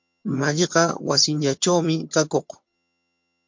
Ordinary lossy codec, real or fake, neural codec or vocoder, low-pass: MP3, 48 kbps; fake; vocoder, 22.05 kHz, 80 mel bands, HiFi-GAN; 7.2 kHz